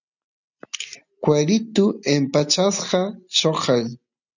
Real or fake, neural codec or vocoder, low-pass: real; none; 7.2 kHz